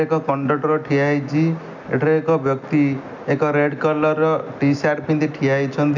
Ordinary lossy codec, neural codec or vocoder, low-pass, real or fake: none; none; 7.2 kHz; real